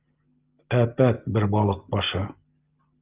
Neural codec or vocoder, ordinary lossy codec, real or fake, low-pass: none; Opus, 32 kbps; real; 3.6 kHz